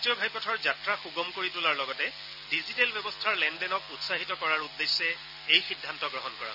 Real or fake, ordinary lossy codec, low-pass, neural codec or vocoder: real; none; 5.4 kHz; none